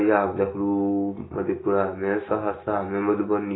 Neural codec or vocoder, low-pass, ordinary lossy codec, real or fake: none; 7.2 kHz; AAC, 16 kbps; real